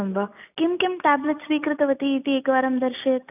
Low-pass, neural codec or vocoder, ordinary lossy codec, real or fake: 3.6 kHz; none; none; real